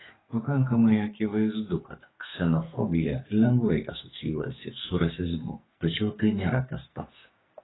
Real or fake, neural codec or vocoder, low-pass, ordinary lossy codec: fake; codec, 44.1 kHz, 2.6 kbps, SNAC; 7.2 kHz; AAC, 16 kbps